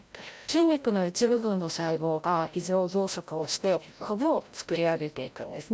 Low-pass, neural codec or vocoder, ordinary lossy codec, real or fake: none; codec, 16 kHz, 0.5 kbps, FreqCodec, larger model; none; fake